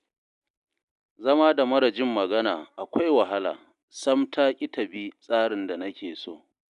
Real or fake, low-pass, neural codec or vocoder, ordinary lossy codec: real; 10.8 kHz; none; none